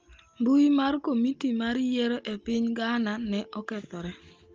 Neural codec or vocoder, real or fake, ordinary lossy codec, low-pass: none; real; Opus, 24 kbps; 7.2 kHz